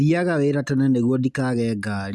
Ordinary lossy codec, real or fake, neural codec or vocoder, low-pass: none; real; none; none